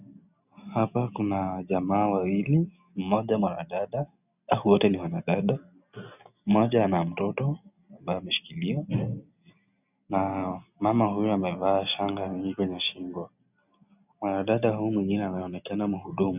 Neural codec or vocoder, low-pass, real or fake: none; 3.6 kHz; real